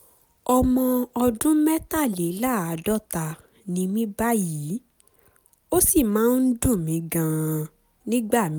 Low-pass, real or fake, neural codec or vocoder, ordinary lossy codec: none; real; none; none